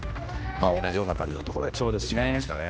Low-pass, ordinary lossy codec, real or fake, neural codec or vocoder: none; none; fake; codec, 16 kHz, 1 kbps, X-Codec, HuBERT features, trained on general audio